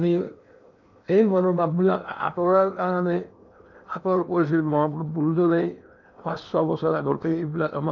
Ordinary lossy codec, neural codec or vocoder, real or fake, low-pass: none; codec, 16 kHz in and 24 kHz out, 0.8 kbps, FocalCodec, streaming, 65536 codes; fake; 7.2 kHz